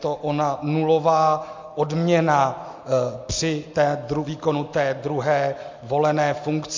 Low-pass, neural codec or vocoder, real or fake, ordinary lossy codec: 7.2 kHz; none; real; MP3, 48 kbps